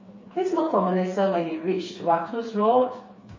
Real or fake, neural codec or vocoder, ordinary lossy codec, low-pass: fake; codec, 16 kHz, 4 kbps, FreqCodec, smaller model; MP3, 32 kbps; 7.2 kHz